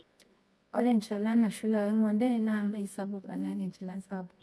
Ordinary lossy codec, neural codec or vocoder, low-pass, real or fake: none; codec, 24 kHz, 0.9 kbps, WavTokenizer, medium music audio release; none; fake